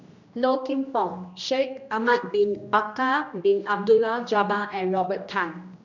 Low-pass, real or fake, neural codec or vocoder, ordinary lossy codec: 7.2 kHz; fake; codec, 16 kHz, 1 kbps, X-Codec, HuBERT features, trained on general audio; none